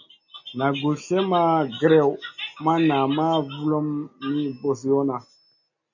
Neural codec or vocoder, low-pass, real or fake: none; 7.2 kHz; real